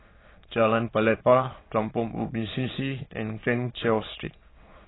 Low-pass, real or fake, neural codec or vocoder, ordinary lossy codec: 7.2 kHz; fake; autoencoder, 22.05 kHz, a latent of 192 numbers a frame, VITS, trained on many speakers; AAC, 16 kbps